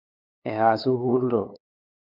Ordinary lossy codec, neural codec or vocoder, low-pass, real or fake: AAC, 48 kbps; codec, 16 kHz, 8 kbps, FunCodec, trained on LibriTTS, 25 frames a second; 5.4 kHz; fake